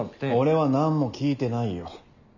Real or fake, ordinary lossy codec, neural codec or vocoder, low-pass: real; none; none; 7.2 kHz